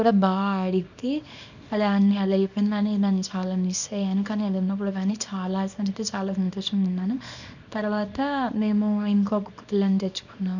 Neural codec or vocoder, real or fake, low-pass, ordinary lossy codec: codec, 24 kHz, 0.9 kbps, WavTokenizer, small release; fake; 7.2 kHz; none